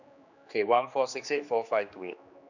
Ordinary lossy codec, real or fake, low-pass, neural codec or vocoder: none; fake; 7.2 kHz; codec, 16 kHz, 2 kbps, X-Codec, HuBERT features, trained on general audio